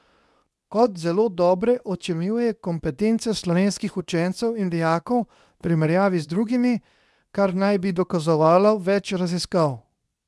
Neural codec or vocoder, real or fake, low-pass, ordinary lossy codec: codec, 24 kHz, 0.9 kbps, WavTokenizer, medium speech release version 2; fake; none; none